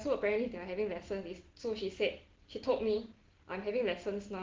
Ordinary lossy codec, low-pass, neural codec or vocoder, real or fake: Opus, 16 kbps; 7.2 kHz; none; real